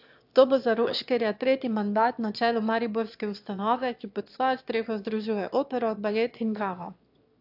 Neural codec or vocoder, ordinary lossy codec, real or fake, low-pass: autoencoder, 22.05 kHz, a latent of 192 numbers a frame, VITS, trained on one speaker; AAC, 48 kbps; fake; 5.4 kHz